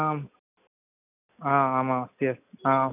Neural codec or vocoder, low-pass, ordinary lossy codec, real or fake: none; 3.6 kHz; none; real